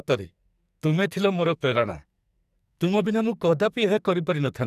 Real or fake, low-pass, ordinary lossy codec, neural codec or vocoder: fake; 14.4 kHz; none; codec, 44.1 kHz, 2.6 kbps, SNAC